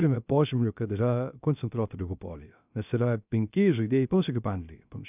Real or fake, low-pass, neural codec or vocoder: fake; 3.6 kHz; codec, 16 kHz, 0.3 kbps, FocalCodec